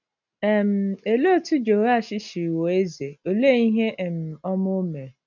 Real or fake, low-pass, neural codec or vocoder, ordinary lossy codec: real; 7.2 kHz; none; none